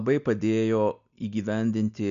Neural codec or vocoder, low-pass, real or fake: none; 7.2 kHz; real